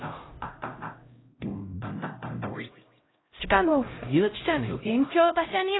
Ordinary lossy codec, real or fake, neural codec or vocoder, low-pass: AAC, 16 kbps; fake; codec, 16 kHz, 0.5 kbps, X-Codec, HuBERT features, trained on LibriSpeech; 7.2 kHz